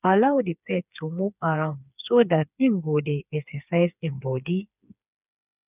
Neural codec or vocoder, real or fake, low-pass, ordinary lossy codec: codec, 16 kHz, 4 kbps, FreqCodec, smaller model; fake; 3.6 kHz; none